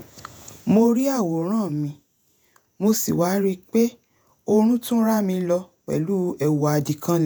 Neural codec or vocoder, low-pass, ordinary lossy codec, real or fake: vocoder, 48 kHz, 128 mel bands, Vocos; none; none; fake